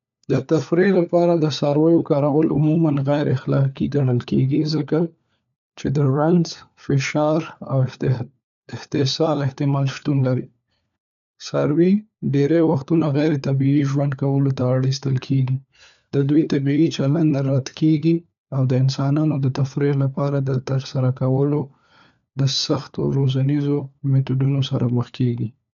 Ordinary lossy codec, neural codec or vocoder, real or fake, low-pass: none; codec, 16 kHz, 4 kbps, FunCodec, trained on LibriTTS, 50 frames a second; fake; 7.2 kHz